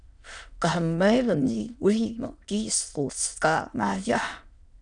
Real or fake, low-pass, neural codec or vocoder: fake; 9.9 kHz; autoencoder, 22.05 kHz, a latent of 192 numbers a frame, VITS, trained on many speakers